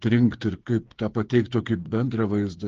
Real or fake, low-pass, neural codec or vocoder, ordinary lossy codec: fake; 7.2 kHz; codec, 16 kHz, 8 kbps, FreqCodec, smaller model; Opus, 16 kbps